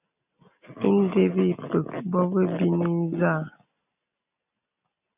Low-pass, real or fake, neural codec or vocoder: 3.6 kHz; real; none